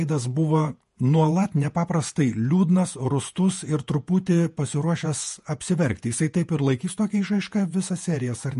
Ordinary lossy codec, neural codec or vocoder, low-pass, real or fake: MP3, 48 kbps; vocoder, 48 kHz, 128 mel bands, Vocos; 14.4 kHz; fake